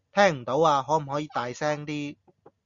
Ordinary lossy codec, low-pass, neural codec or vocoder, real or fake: Opus, 64 kbps; 7.2 kHz; none; real